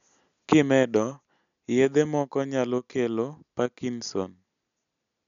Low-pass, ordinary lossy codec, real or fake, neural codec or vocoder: 7.2 kHz; none; real; none